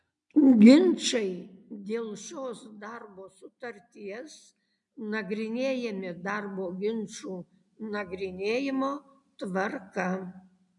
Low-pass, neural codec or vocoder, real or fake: 10.8 kHz; none; real